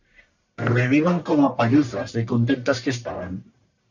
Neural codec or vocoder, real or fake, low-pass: codec, 44.1 kHz, 1.7 kbps, Pupu-Codec; fake; 7.2 kHz